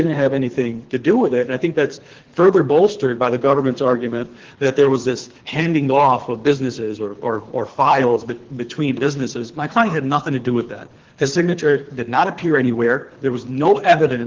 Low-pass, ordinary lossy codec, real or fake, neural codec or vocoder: 7.2 kHz; Opus, 16 kbps; fake; codec, 24 kHz, 3 kbps, HILCodec